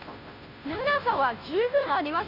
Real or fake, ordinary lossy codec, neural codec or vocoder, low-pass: fake; none; codec, 16 kHz, 0.5 kbps, FunCodec, trained on Chinese and English, 25 frames a second; 5.4 kHz